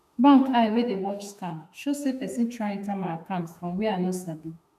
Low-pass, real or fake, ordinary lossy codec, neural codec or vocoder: 14.4 kHz; fake; none; autoencoder, 48 kHz, 32 numbers a frame, DAC-VAE, trained on Japanese speech